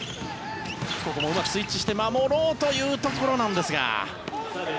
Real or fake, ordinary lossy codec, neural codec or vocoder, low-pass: real; none; none; none